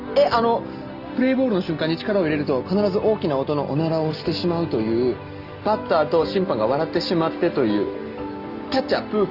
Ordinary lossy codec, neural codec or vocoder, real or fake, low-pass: Opus, 32 kbps; none; real; 5.4 kHz